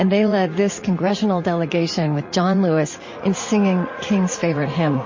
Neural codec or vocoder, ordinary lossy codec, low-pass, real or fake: vocoder, 44.1 kHz, 80 mel bands, Vocos; MP3, 32 kbps; 7.2 kHz; fake